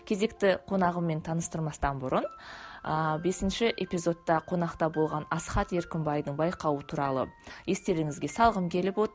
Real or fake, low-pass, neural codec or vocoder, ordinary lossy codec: real; none; none; none